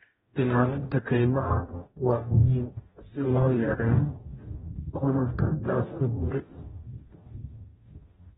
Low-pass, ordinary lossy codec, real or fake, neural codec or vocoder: 19.8 kHz; AAC, 16 kbps; fake; codec, 44.1 kHz, 0.9 kbps, DAC